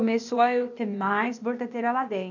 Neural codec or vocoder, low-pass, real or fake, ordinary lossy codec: codec, 16 kHz, 0.8 kbps, ZipCodec; 7.2 kHz; fake; none